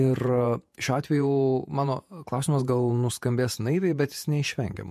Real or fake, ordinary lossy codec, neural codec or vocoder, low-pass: fake; MP3, 64 kbps; vocoder, 48 kHz, 128 mel bands, Vocos; 14.4 kHz